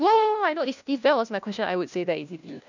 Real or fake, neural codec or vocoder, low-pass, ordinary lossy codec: fake; codec, 16 kHz, 1 kbps, FunCodec, trained on LibriTTS, 50 frames a second; 7.2 kHz; none